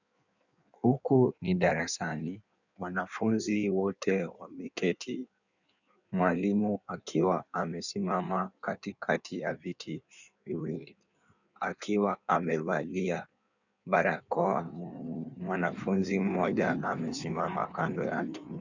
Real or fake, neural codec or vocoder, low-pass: fake; codec, 16 kHz in and 24 kHz out, 1.1 kbps, FireRedTTS-2 codec; 7.2 kHz